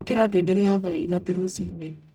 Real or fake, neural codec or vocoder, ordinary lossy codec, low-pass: fake; codec, 44.1 kHz, 0.9 kbps, DAC; none; 19.8 kHz